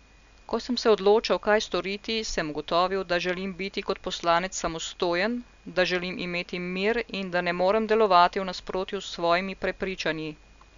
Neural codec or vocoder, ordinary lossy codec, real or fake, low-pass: none; Opus, 64 kbps; real; 7.2 kHz